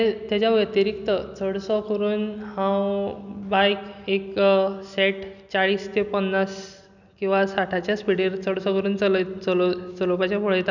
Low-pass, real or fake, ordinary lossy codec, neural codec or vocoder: 7.2 kHz; real; none; none